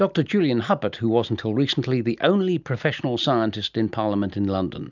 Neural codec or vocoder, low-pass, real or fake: none; 7.2 kHz; real